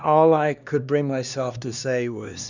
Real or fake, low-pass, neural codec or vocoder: fake; 7.2 kHz; codec, 16 kHz, 2 kbps, X-Codec, HuBERT features, trained on LibriSpeech